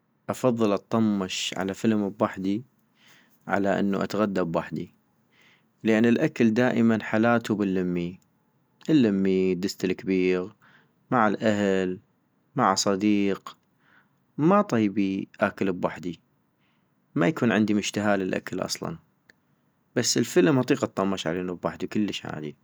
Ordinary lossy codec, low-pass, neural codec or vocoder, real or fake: none; none; none; real